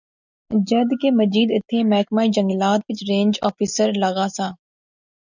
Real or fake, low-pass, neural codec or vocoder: real; 7.2 kHz; none